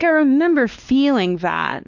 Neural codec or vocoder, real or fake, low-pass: codec, 16 kHz, 2 kbps, X-Codec, WavLM features, trained on Multilingual LibriSpeech; fake; 7.2 kHz